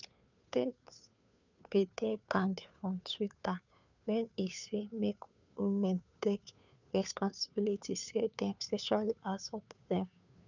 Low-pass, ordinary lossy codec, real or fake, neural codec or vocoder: 7.2 kHz; none; fake; codec, 16 kHz, 2 kbps, FunCodec, trained on Chinese and English, 25 frames a second